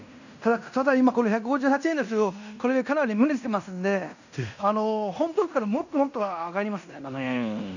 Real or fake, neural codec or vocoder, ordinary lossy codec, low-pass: fake; codec, 16 kHz in and 24 kHz out, 0.9 kbps, LongCat-Audio-Codec, fine tuned four codebook decoder; none; 7.2 kHz